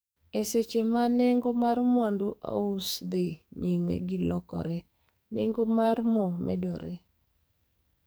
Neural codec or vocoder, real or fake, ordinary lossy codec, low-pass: codec, 44.1 kHz, 2.6 kbps, SNAC; fake; none; none